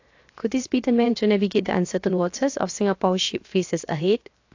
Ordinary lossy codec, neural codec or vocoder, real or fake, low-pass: AAC, 48 kbps; codec, 16 kHz, 0.7 kbps, FocalCodec; fake; 7.2 kHz